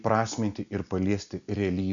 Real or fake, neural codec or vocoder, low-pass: real; none; 7.2 kHz